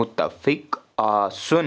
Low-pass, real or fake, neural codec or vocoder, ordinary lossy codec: none; real; none; none